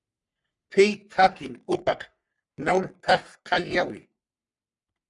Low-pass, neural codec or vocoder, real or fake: 10.8 kHz; codec, 44.1 kHz, 3.4 kbps, Pupu-Codec; fake